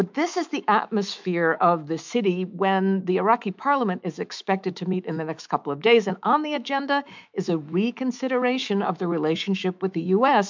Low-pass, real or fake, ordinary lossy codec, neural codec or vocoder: 7.2 kHz; fake; MP3, 64 kbps; autoencoder, 48 kHz, 128 numbers a frame, DAC-VAE, trained on Japanese speech